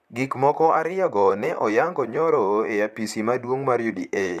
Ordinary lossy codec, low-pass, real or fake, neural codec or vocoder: none; 14.4 kHz; fake; vocoder, 44.1 kHz, 128 mel bands, Pupu-Vocoder